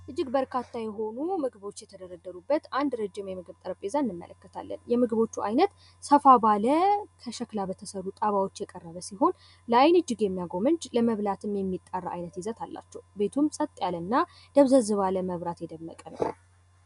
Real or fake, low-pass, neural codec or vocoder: real; 10.8 kHz; none